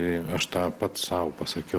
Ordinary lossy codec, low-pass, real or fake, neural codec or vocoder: Opus, 16 kbps; 14.4 kHz; real; none